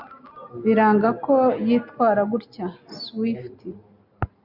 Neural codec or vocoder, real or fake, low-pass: none; real; 5.4 kHz